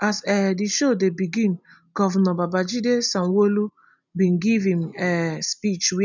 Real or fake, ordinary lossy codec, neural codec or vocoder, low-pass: real; none; none; 7.2 kHz